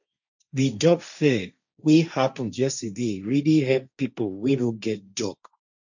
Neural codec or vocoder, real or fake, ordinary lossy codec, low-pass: codec, 16 kHz, 1.1 kbps, Voila-Tokenizer; fake; none; 7.2 kHz